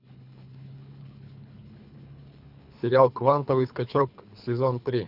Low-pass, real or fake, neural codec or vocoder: 5.4 kHz; fake; codec, 24 kHz, 3 kbps, HILCodec